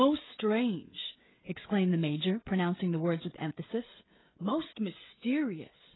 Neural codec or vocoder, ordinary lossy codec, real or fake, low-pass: codec, 16 kHz, 16 kbps, FreqCodec, smaller model; AAC, 16 kbps; fake; 7.2 kHz